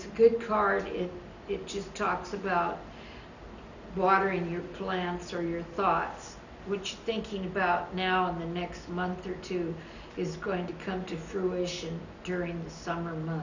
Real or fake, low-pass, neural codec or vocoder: real; 7.2 kHz; none